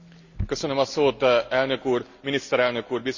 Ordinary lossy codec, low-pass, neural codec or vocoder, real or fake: Opus, 64 kbps; 7.2 kHz; none; real